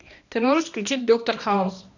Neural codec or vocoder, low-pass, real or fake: codec, 16 kHz, 1 kbps, X-Codec, HuBERT features, trained on balanced general audio; 7.2 kHz; fake